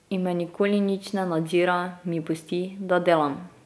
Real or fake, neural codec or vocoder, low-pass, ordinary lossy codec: real; none; none; none